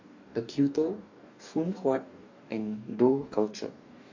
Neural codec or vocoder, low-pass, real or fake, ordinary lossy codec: codec, 44.1 kHz, 2.6 kbps, DAC; 7.2 kHz; fake; AAC, 48 kbps